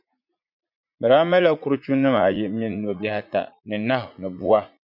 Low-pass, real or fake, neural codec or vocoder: 5.4 kHz; fake; vocoder, 44.1 kHz, 80 mel bands, Vocos